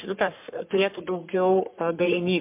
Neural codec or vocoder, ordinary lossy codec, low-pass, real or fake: codec, 44.1 kHz, 3.4 kbps, Pupu-Codec; MP3, 32 kbps; 3.6 kHz; fake